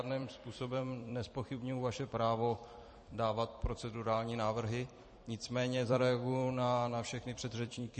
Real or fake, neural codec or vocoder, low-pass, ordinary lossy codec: fake; vocoder, 44.1 kHz, 128 mel bands every 256 samples, BigVGAN v2; 10.8 kHz; MP3, 32 kbps